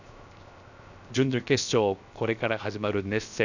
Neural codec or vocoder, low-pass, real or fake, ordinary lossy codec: codec, 16 kHz, 0.7 kbps, FocalCodec; 7.2 kHz; fake; none